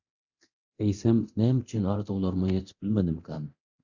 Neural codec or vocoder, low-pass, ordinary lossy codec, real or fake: codec, 24 kHz, 0.9 kbps, DualCodec; 7.2 kHz; Opus, 64 kbps; fake